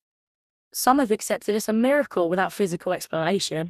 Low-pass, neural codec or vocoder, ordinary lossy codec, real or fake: 14.4 kHz; codec, 44.1 kHz, 2.6 kbps, DAC; none; fake